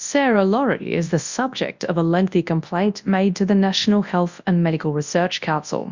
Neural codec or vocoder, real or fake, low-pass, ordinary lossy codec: codec, 24 kHz, 0.9 kbps, WavTokenizer, large speech release; fake; 7.2 kHz; Opus, 64 kbps